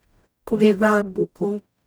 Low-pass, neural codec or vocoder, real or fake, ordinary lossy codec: none; codec, 44.1 kHz, 0.9 kbps, DAC; fake; none